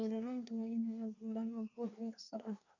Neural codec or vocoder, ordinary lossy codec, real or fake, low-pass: codec, 24 kHz, 1 kbps, SNAC; none; fake; 7.2 kHz